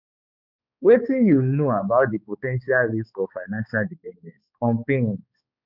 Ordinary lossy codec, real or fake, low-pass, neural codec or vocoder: none; fake; 5.4 kHz; codec, 16 kHz, 4 kbps, X-Codec, HuBERT features, trained on balanced general audio